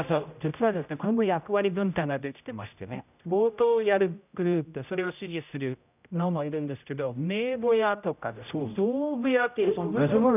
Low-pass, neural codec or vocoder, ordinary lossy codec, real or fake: 3.6 kHz; codec, 16 kHz, 0.5 kbps, X-Codec, HuBERT features, trained on general audio; none; fake